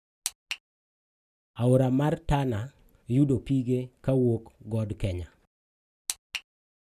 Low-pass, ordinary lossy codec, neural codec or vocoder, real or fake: 14.4 kHz; none; none; real